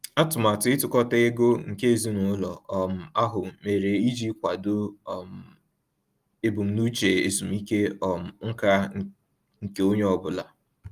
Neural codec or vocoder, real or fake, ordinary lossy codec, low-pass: none; real; Opus, 32 kbps; 14.4 kHz